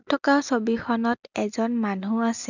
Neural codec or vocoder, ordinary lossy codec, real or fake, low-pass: none; none; real; 7.2 kHz